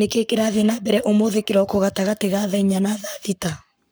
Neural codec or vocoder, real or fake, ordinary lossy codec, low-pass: codec, 44.1 kHz, 7.8 kbps, Pupu-Codec; fake; none; none